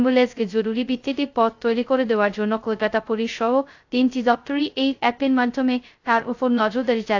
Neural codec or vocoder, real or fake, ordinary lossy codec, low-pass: codec, 16 kHz, 0.2 kbps, FocalCodec; fake; AAC, 48 kbps; 7.2 kHz